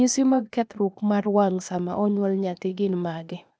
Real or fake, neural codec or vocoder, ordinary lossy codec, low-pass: fake; codec, 16 kHz, 0.8 kbps, ZipCodec; none; none